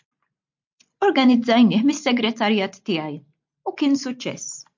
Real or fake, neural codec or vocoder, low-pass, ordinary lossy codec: real; none; 7.2 kHz; MP3, 48 kbps